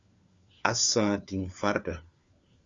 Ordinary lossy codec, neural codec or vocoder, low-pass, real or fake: Opus, 64 kbps; codec, 16 kHz, 4 kbps, FunCodec, trained on LibriTTS, 50 frames a second; 7.2 kHz; fake